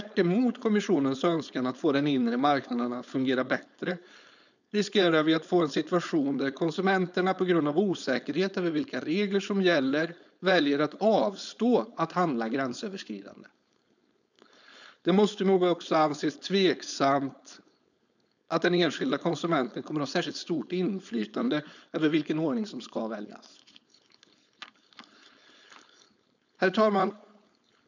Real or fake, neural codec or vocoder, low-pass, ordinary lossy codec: fake; codec, 16 kHz, 4.8 kbps, FACodec; 7.2 kHz; none